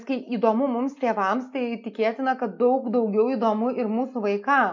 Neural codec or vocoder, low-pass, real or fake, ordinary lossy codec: none; 7.2 kHz; real; MP3, 48 kbps